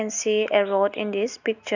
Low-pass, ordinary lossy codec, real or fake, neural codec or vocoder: 7.2 kHz; none; real; none